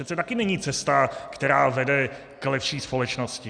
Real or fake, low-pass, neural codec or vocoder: real; 9.9 kHz; none